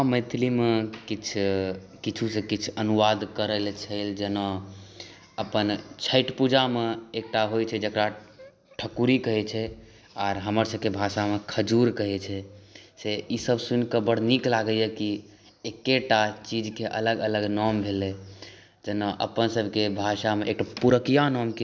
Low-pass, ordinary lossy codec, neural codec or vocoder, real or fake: none; none; none; real